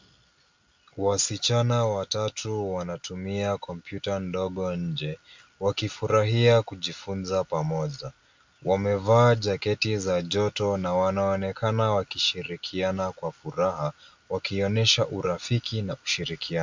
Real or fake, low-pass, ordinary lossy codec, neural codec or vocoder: real; 7.2 kHz; MP3, 64 kbps; none